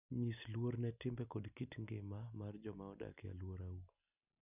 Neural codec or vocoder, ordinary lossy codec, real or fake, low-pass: none; none; real; 3.6 kHz